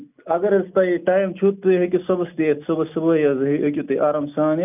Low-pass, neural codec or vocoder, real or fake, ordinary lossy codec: 3.6 kHz; none; real; none